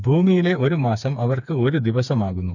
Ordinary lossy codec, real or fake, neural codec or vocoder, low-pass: none; fake; codec, 16 kHz, 4 kbps, FreqCodec, smaller model; 7.2 kHz